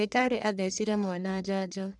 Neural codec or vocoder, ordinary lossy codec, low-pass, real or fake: codec, 44.1 kHz, 1.7 kbps, Pupu-Codec; none; 10.8 kHz; fake